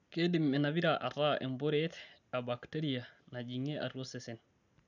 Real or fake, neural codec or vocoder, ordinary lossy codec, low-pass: real; none; none; 7.2 kHz